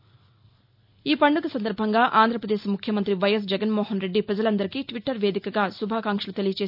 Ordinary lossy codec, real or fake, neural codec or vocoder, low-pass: none; real; none; 5.4 kHz